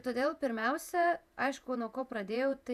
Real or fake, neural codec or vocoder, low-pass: fake; vocoder, 48 kHz, 128 mel bands, Vocos; 14.4 kHz